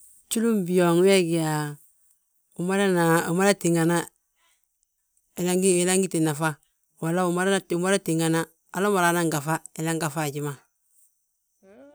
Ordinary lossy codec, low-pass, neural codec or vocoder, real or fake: none; none; none; real